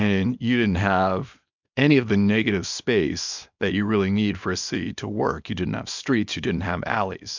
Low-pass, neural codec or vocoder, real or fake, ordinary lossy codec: 7.2 kHz; codec, 24 kHz, 0.9 kbps, WavTokenizer, small release; fake; MP3, 64 kbps